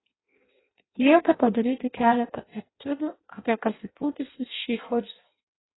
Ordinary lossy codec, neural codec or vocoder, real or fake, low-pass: AAC, 16 kbps; codec, 16 kHz in and 24 kHz out, 0.6 kbps, FireRedTTS-2 codec; fake; 7.2 kHz